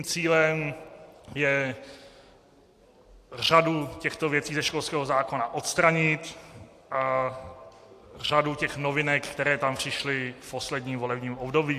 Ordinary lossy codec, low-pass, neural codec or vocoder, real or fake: AAC, 64 kbps; 14.4 kHz; none; real